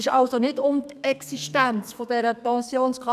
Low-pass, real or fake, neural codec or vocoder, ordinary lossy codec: 14.4 kHz; fake; codec, 32 kHz, 1.9 kbps, SNAC; none